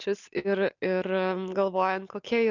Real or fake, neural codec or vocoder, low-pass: real; none; 7.2 kHz